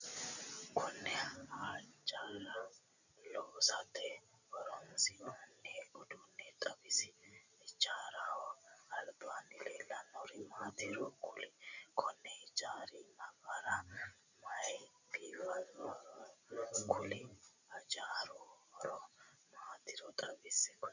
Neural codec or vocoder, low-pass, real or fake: none; 7.2 kHz; real